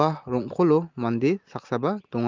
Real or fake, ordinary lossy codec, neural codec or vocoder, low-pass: real; Opus, 32 kbps; none; 7.2 kHz